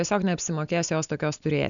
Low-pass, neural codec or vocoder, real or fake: 7.2 kHz; none; real